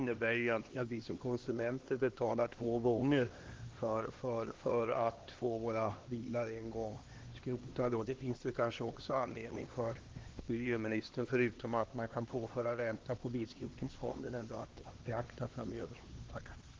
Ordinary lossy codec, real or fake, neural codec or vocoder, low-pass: Opus, 16 kbps; fake; codec, 16 kHz, 2 kbps, X-Codec, HuBERT features, trained on LibriSpeech; 7.2 kHz